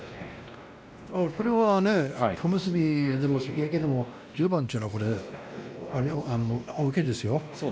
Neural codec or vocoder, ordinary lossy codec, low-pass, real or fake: codec, 16 kHz, 1 kbps, X-Codec, WavLM features, trained on Multilingual LibriSpeech; none; none; fake